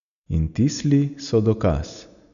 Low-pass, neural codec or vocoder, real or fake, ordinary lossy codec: 7.2 kHz; none; real; none